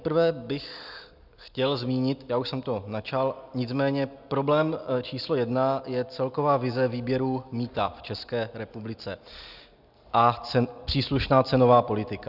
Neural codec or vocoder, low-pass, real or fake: none; 5.4 kHz; real